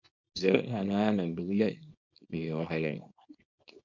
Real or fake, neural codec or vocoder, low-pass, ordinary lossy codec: fake; codec, 24 kHz, 0.9 kbps, WavTokenizer, small release; 7.2 kHz; MP3, 48 kbps